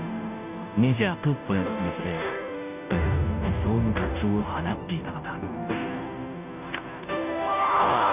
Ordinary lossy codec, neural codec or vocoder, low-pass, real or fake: none; codec, 16 kHz, 0.5 kbps, FunCodec, trained on Chinese and English, 25 frames a second; 3.6 kHz; fake